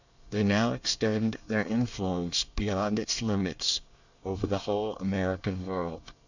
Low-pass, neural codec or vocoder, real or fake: 7.2 kHz; codec, 24 kHz, 1 kbps, SNAC; fake